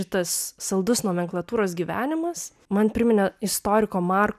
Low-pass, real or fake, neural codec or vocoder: 14.4 kHz; real; none